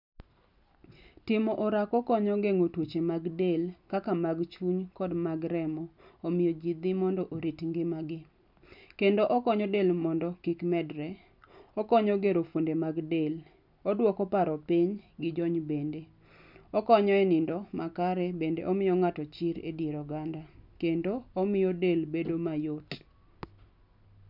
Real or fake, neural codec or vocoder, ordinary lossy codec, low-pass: real; none; none; 5.4 kHz